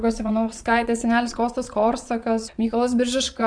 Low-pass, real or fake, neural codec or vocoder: 9.9 kHz; fake; vocoder, 22.05 kHz, 80 mel bands, Vocos